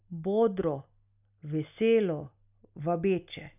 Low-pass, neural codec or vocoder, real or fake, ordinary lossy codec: 3.6 kHz; none; real; none